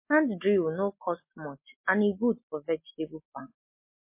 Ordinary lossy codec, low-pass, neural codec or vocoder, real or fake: MP3, 24 kbps; 3.6 kHz; none; real